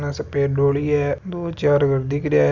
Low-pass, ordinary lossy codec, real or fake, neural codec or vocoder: 7.2 kHz; none; real; none